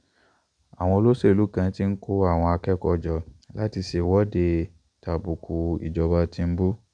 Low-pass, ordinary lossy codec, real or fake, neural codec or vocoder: 9.9 kHz; none; real; none